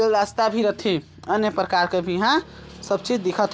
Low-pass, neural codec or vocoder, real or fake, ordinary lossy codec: none; none; real; none